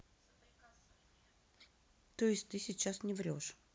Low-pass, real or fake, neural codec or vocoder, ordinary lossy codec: none; real; none; none